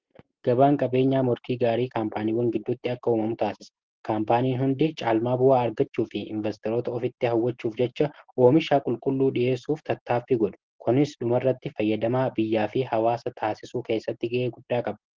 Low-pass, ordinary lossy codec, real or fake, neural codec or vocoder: 7.2 kHz; Opus, 16 kbps; real; none